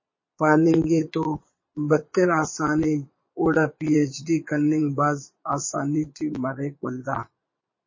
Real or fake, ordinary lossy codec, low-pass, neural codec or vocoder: fake; MP3, 32 kbps; 7.2 kHz; vocoder, 22.05 kHz, 80 mel bands, Vocos